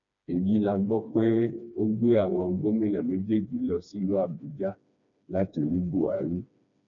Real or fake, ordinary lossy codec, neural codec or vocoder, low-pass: fake; none; codec, 16 kHz, 2 kbps, FreqCodec, smaller model; 7.2 kHz